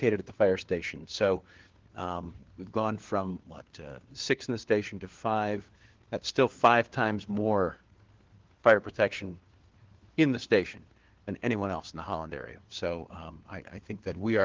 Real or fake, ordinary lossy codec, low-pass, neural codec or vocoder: fake; Opus, 16 kbps; 7.2 kHz; codec, 16 kHz, 4 kbps, FunCodec, trained on LibriTTS, 50 frames a second